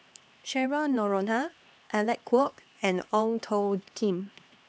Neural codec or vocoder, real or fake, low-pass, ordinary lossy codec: codec, 16 kHz, 2 kbps, X-Codec, HuBERT features, trained on LibriSpeech; fake; none; none